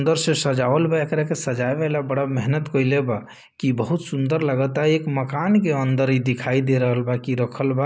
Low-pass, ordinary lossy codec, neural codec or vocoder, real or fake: none; none; none; real